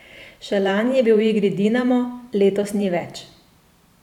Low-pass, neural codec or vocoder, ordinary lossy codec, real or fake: 19.8 kHz; vocoder, 44.1 kHz, 128 mel bands every 512 samples, BigVGAN v2; none; fake